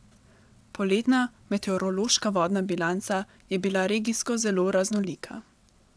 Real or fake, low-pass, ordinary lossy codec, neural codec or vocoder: fake; none; none; vocoder, 22.05 kHz, 80 mel bands, WaveNeXt